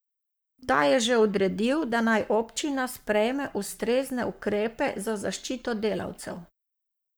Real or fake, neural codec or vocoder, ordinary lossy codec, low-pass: fake; codec, 44.1 kHz, 7.8 kbps, Pupu-Codec; none; none